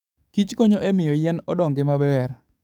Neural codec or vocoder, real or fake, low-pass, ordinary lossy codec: codec, 44.1 kHz, 7.8 kbps, DAC; fake; 19.8 kHz; none